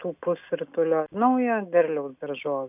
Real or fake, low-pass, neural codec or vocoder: real; 3.6 kHz; none